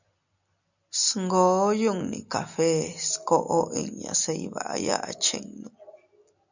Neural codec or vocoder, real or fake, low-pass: none; real; 7.2 kHz